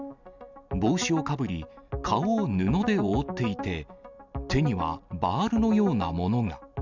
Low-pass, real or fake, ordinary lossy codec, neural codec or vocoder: 7.2 kHz; real; none; none